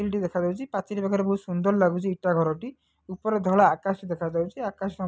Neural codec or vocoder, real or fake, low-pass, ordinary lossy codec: none; real; none; none